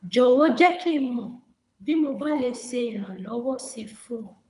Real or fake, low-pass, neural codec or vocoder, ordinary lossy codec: fake; 10.8 kHz; codec, 24 kHz, 3 kbps, HILCodec; none